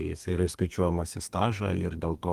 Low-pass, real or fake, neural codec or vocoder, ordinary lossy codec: 14.4 kHz; fake; codec, 32 kHz, 1.9 kbps, SNAC; Opus, 24 kbps